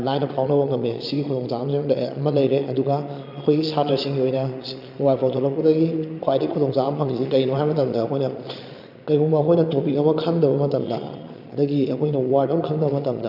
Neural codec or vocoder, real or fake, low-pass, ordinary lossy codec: vocoder, 22.05 kHz, 80 mel bands, Vocos; fake; 5.4 kHz; none